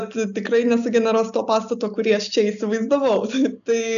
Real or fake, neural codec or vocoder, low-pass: real; none; 7.2 kHz